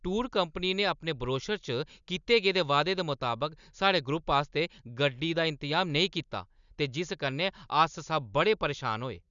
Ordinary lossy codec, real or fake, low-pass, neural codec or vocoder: none; real; 7.2 kHz; none